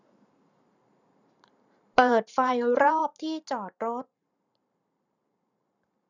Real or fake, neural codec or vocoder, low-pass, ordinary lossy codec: fake; vocoder, 44.1 kHz, 128 mel bands every 512 samples, BigVGAN v2; 7.2 kHz; none